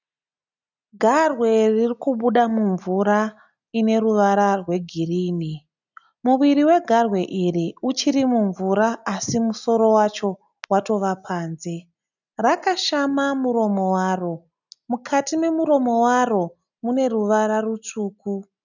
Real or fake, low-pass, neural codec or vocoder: real; 7.2 kHz; none